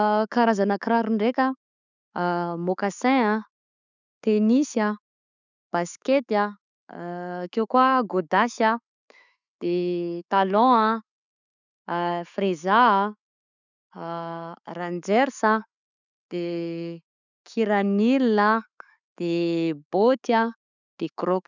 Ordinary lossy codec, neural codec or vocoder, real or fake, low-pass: none; none; real; 7.2 kHz